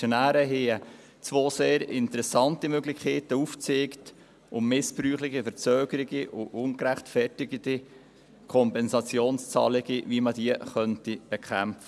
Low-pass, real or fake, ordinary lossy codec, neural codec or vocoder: none; real; none; none